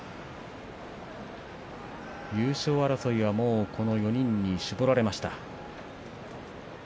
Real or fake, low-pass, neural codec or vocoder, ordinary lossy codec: real; none; none; none